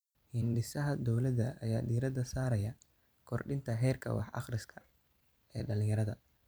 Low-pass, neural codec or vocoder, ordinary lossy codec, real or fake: none; vocoder, 44.1 kHz, 128 mel bands every 256 samples, BigVGAN v2; none; fake